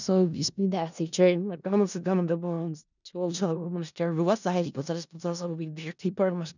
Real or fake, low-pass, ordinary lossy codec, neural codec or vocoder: fake; 7.2 kHz; none; codec, 16 kHz in and 24 kHz out, 0.4 kbps, LongCat-Audio-Codec, four codebook decoder